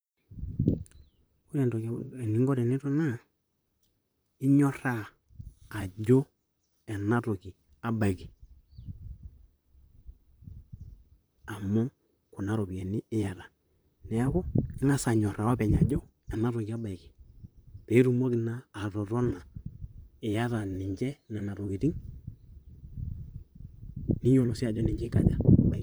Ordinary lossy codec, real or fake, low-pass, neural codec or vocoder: none; fake; none; vocoder, 44.1 kHz, 128 mel bands, Pupu-Vocoder